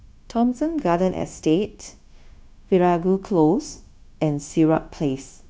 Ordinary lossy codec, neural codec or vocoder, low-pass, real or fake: none; codec, 16 kHz, 0.9 kbps, LongCat-Audio-Codec; none; fake